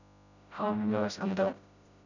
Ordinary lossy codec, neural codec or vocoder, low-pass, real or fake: none; codec, 16 kHz, 0.5 kbps, FreqCodec, smaller model; 7.2 kHz; fake